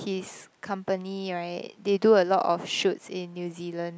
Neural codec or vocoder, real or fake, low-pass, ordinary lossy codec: none; real; none; none